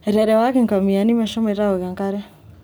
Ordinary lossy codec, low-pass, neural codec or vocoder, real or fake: none; none; none; real